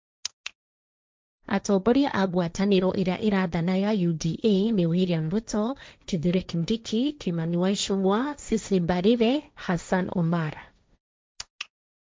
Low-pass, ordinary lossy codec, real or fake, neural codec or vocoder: none; none; fake; codec, 16 kHz, 1.1 kbps, Voila-Tokenizer